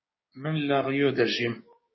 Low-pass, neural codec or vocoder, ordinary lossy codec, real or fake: 7.2 kHz; codec, 16 kHz, 6 kbps, DAC; MP3, 24 kbps; fake